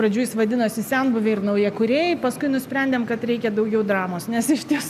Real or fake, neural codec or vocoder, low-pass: real; none; 14.4 kHz